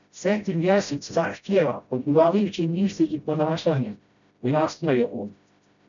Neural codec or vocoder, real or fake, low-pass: codec, 16 kHz, 0.5 kbps, FreqCodec, smaller model; fake; 7.2 kHz